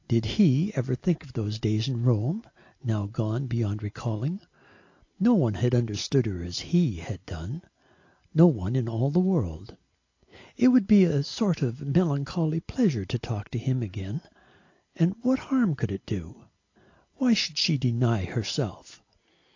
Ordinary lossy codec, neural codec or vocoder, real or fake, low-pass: AAC, 48 kbps; none; real; 7.2 kHz